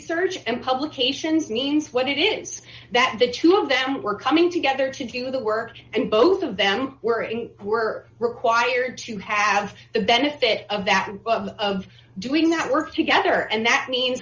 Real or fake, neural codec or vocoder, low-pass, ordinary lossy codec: real; none; 7.2 kHz; Opus, 32 kbps